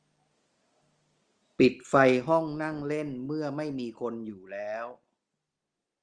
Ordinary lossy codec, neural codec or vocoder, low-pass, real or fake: Opus, 24 kbps; none; 9.9 kHz; real